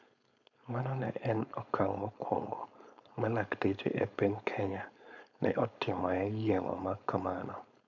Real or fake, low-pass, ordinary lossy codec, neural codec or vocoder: fake; 7.2 kHz; none; codec, 16 kHz, 4.8 kbps, FACodec